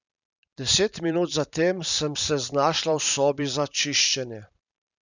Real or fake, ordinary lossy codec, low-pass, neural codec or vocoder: fake; none; 7.2 kHz; vocoder, 44.1 kHz, 128 mel bands every 512 samples, BigVGAN v2